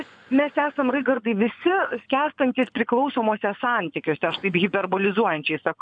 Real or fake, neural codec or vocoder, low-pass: fake; vocoder, 24 kHz, 100 mel bands, Vocos; 9.9 kHz